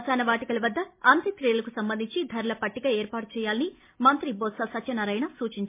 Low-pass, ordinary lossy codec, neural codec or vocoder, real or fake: 3.6 kHz; MP3, 24 kbps; none; real